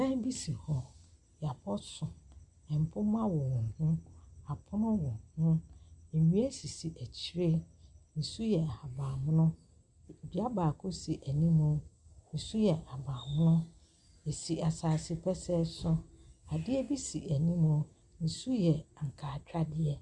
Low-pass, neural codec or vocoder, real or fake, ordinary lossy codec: 10.8 kHz; none; real; Opus, 64 kbps